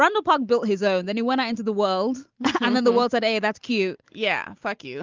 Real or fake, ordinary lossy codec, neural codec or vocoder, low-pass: real; Opus, 24 kbps; none; 7.2 kHz